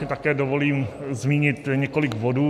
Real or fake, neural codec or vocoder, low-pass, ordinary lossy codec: real; none; 14.4 kHz; MP3, 96 kbps